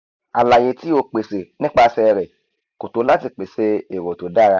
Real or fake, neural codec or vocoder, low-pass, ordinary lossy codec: real; none; 7.2 kHz; none